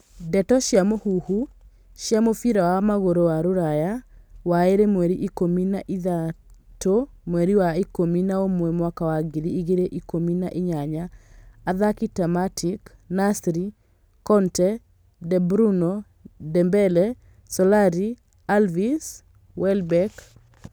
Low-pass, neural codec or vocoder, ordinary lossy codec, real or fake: none; none; none; real